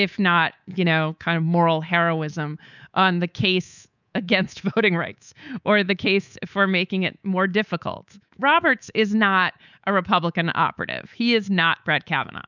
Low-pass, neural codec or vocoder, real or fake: 7.2 kHz; codec, 24 kHz, 3.1 kbps, DualCodec; fake